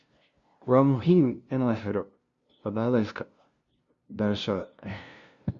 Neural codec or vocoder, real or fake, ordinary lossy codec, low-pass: codec, 16 kHz, 0.5 kbps, FunCodec, trained on LibriTTS, 25 frames a second; fake; Opus, 64 kbps; 7.2 kHz